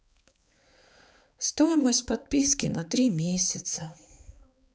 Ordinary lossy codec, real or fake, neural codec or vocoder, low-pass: none; fake; codec, 16 kHz, 4 kbps, X-Codec, HuBERT features, trained on balanced general audio; none